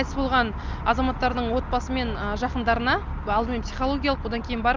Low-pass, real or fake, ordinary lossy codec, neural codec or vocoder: 7.2 kHz; real; Opus, 24 kbps; none